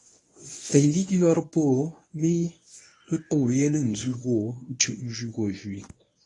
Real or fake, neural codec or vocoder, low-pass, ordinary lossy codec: fake; codec, 24 kHz, 0.9 kbps, WavTokenizer, medium speech release version 1; 10.8 kHz; AAC, 32 kbps